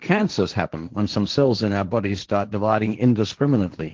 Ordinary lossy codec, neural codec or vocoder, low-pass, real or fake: Opus, 16 kbps; codec, 16 kHz, 1.1 kbps, Voila-Tokenizer; 7.2 kHz; fake